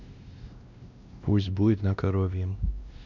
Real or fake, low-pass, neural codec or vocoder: fake; 7.2 kHz; codec, 16 kHz, 1 kbps, X-Codec, WavLM features, trained on Multilingual LibriSpeech